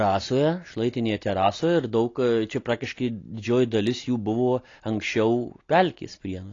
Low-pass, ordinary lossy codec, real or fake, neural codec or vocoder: 7.2 kHz; AAC, 32 kbps; real; none